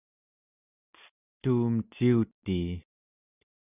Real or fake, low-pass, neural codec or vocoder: real; 3.6 kHz; none